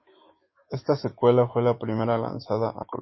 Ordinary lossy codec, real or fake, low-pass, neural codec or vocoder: MP3, 24 kbps; fake; 7.2 kHz; autoencoder, 48 kHz, 128 numbers a frame, DAC-VAE, trained on Japanese speech